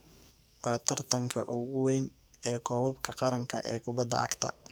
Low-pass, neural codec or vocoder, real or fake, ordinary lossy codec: none; codec, 44.1 kHz, 2.6 kbps, SNAC; fake; none